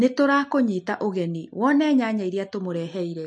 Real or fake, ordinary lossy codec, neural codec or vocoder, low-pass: real; MP3, 48 kbps; none; 10.8 kHz